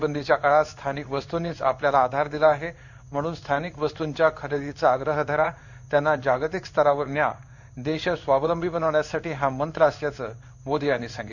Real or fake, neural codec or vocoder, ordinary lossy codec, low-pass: fake; codec, 16 kHz in and 24 kHz out, 1 kbps, XY-Tokenizer; none; 7.2 kHz